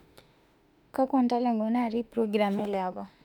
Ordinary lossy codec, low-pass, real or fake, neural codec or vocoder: none; 19.8 kHz; fake; autoencoder, 48 kHz, 32 numbers a frame, DAC-VAE, trained on Japanese speech